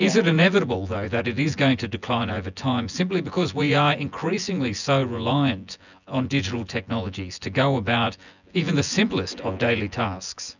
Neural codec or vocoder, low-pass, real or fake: vocoder, 24 kHz, 100 mel bands, Vocos; 7.2 kHz; fake